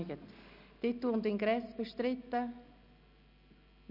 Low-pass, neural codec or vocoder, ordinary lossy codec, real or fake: 5.4 kHz; none; none; real